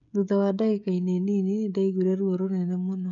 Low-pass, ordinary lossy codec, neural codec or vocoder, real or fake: 7.2 kHz; none; codec, 16 kHz, 16 kbps, FreqCodec, smaller model; fake